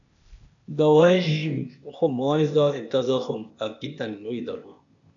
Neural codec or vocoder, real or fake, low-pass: codec, 16 kHz, 0.8 kbps, ZipCodec; fake; 7.2 kHz